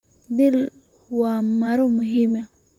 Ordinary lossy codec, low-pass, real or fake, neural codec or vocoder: none; 19.8 kHz; fake; vocoder, 44.1 kHz, 128 mel bands, Pupu-Vocoder